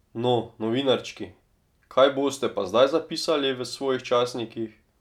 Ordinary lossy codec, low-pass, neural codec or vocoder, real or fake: none; 19.8 kHz; none; real